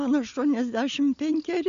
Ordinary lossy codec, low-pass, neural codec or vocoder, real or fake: Opus, 64 kbps; 7.2 kHz; none; real